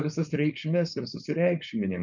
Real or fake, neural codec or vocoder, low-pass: fake; codec, 16 kHz, 4.8 kbps, FACodec; 7.2 kHz